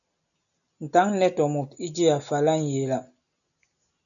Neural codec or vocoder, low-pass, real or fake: none; 7.2 kHz; real